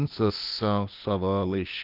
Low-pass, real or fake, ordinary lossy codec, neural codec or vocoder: 5.4 kHz; fake; Opus, 24 kbps; codec, 16 kHz, 0.8 kbps, ZipCodec